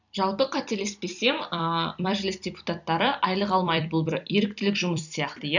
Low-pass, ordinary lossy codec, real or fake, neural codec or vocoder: 7.2 kHz; none; fake; vocoder, 44.1 kHz, 128 mel bands every 256 samples, BigVGAN v2